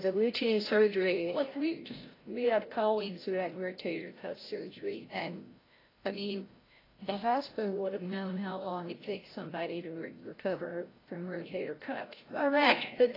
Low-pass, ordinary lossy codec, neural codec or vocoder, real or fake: 5.4 kHz; AAC, 24 kbps; codec, 16 kHz, 0.5 kbps, FreqCodec, larger model; fake